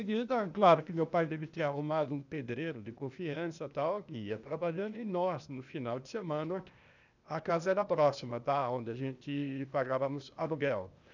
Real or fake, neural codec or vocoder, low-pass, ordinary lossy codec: fake; codec, 16 kHz, 0.8 kbps, ZipCodec; 7.2 kHz; none